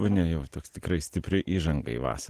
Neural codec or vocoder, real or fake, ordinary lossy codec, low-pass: none; real; Opus, 16 kbps; 14.4 kHz